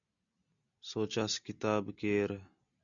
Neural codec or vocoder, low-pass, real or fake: none; 7.2 kHz; real